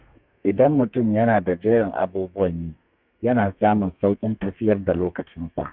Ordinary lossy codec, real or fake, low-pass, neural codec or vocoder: none; fake; 5.4 kHz; codec, 32 kHz, 1.9 kbps, SNAC